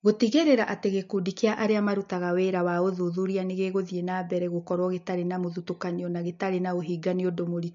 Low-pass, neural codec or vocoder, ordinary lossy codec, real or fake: 7.2 kHz; none; MP3, 48 kbps; real